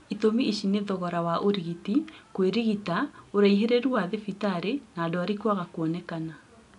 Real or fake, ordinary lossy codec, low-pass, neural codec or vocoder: real; none; 10.8 kHz; none